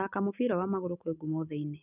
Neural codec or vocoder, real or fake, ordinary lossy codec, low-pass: none; real; none; 3.6 kHz